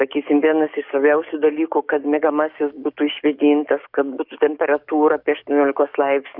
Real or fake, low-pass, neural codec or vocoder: fake; 5.4 kHz; codec, 44.1 kHz, 7.8 kbps, DAC